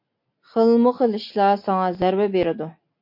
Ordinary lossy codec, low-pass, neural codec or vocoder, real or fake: MP3, 32 kbps; 5.4 kHz; none; real